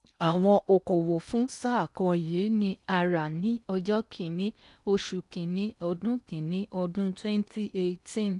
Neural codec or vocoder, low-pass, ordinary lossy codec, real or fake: codec, 16 kHz in and 24 kHz out, 0.8 kbps, FocalCodec, streaming, 65536 codes; 10.8 kHz; none; fake